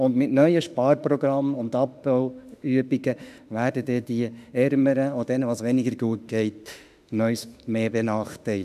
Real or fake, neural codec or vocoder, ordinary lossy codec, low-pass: fake; autoencoder, 48 kHz, 32 numbers a frame, DAC-VAE, trained on Japanese speech; none; 14.4 kHz